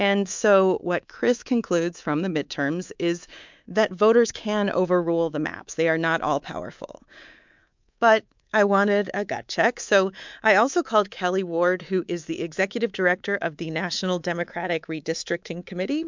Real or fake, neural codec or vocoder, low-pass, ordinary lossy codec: fake; codec, 24 kHz, 3.1 kbps, DualCodec; 7.2 kHz; MP3, 64 kbps